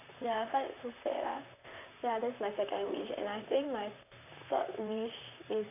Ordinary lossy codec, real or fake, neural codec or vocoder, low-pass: none; fake; vocoder, 44.1 kHz, 128 mel bands, Pupu-Vocoder; 3.6 kHz